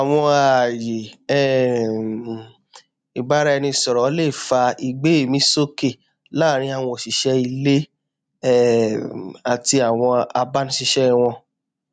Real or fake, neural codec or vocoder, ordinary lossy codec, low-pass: real; none; none; 9.9 kHz